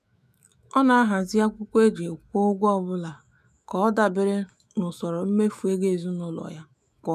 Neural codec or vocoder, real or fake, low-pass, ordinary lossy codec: autoencoder, 48 kHz, 128 numbers a frame, DAC-VAE, trained on Japanese speech; fake; 14.4 kHz; none